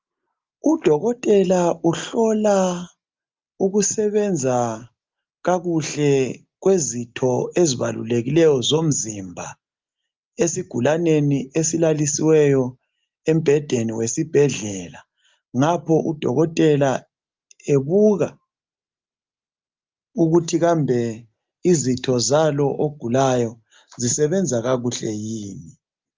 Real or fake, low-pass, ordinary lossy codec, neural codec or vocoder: real; 7.2 kHz; Opus, 24 kbps; none